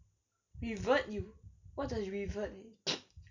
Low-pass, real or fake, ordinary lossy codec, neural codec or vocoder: 7.2 kHz; real; none; none